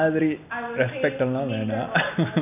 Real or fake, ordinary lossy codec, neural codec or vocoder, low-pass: real; none; none; 3.6 kHz